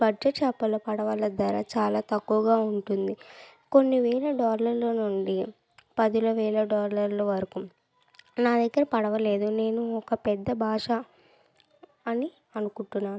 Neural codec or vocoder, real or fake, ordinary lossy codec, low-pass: none; real; none; none